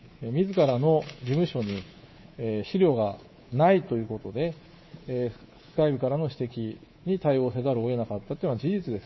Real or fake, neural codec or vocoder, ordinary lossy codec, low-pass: fake; codec, 24 kHz, 3.1 kbps, DualCodec; MP3, 24 kbps; 7.2 kHz